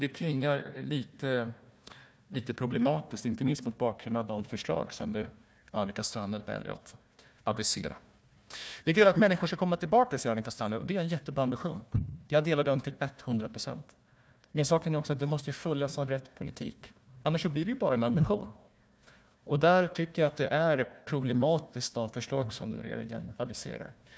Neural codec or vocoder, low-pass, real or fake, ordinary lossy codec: codec, 16 kHz, 1 kbps, FunCodec, trained on Chinese and English, 50 frames a second; none; fake; none